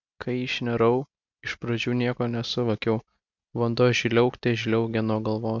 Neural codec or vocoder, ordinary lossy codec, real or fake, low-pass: none; MP3, 64 kbps; real; 7.2 kHz